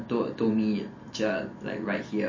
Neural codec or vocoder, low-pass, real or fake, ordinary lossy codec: none; 7.2 kHz; real; MP3, 32 kbps